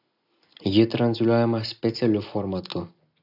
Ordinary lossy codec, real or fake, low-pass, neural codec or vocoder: AAC, 48 kbps; real; 5.4 kHz; none